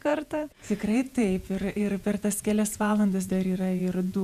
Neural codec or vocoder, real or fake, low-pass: vocoder, 48 kHz, 128 mel bands, Vocos; fake; 14.4 kHz